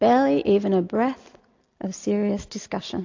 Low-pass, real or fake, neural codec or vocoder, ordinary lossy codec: 7.2 kHz; real; none; AAC, 48 kbps